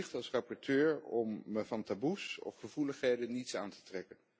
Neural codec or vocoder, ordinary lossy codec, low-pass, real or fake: none; none; none; real